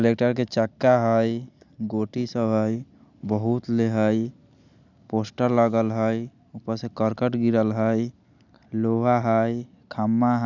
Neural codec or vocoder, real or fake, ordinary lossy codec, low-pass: none; real; none; 7.2 kHz